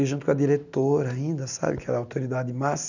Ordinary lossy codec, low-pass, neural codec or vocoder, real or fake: none; 7.2 kHz; none; real